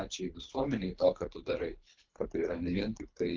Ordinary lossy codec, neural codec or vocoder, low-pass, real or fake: Opus, 32 kbps; codec, 24 kHz, 3 kbps, HILCodec; 7.2 kHz; fake